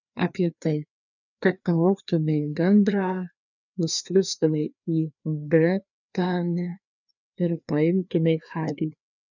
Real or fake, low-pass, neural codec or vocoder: fake; 7.2 kHz; codec, 16 kHz, 2 kbps, FreqCodec, larger model